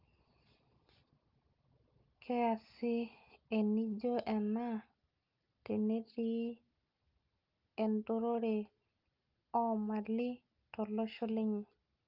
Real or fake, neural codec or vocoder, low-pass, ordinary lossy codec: real; none; 5.4 kHz; Opus, 32 kbps